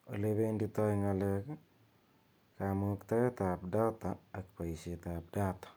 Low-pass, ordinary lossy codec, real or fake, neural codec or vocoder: none; none; real; none